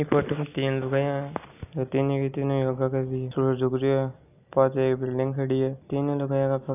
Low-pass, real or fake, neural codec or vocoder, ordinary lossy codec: 3.6 kHz; real; none; none